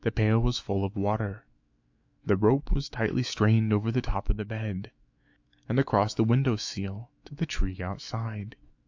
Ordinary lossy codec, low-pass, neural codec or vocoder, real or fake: AAC, 48 kbps; 7.2 kHz; autoencoder, 48 kHz, 128 numbers a frame, DAC-VAE, trained on Japanese speech; fake